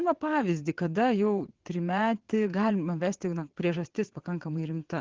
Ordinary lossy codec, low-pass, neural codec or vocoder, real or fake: Opus, 16 kbps; 7.2 kHz; vocoder, 22.05 kHz, 80 mel bands, WaveNeXt; fake